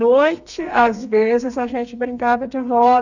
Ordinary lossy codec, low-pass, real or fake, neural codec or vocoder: none; 7.2 kHz; fake; codec, 32 kHz, 1.9 kbps, SNAC